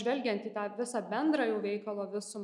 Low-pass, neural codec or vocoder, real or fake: 10.8 kHz; none; real